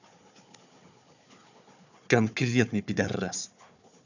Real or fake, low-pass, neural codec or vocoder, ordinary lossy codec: fake; 7.2 kHz; codec, 16 kHz, 4 kbps, FunCodec, trained on Chinese and English, 50 frames a second; none